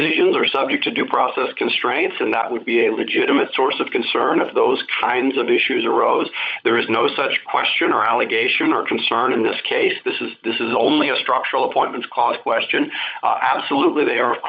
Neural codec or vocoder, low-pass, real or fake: codec, 16 kHz, 16 kbps, FunCodec, trained on LibriTTS, 50 frames a second; 7.2 kHz; fake